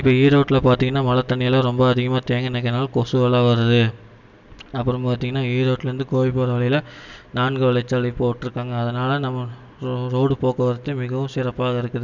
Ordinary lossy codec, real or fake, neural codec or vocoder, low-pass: none; real; none; 7.2 kHz